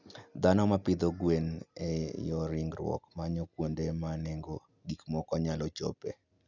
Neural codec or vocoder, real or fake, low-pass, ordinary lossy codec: none; real; 7.2 kHz; none